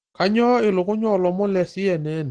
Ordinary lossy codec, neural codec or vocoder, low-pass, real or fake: Opus, 16 kbps; none; 9.9 kHz; real